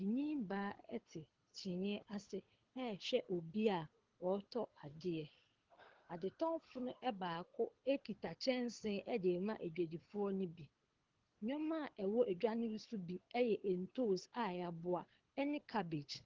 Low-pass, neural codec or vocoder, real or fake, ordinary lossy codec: 7.2 kHz; codec, 24 kHz, 6 kbps, HILCodec; fake; Opus, 16 kbps